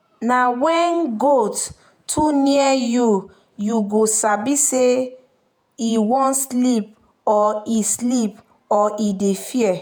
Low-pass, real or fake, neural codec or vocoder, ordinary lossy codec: none; fake; vocoder, 48 kHz, 128 mel bands, Vocos; none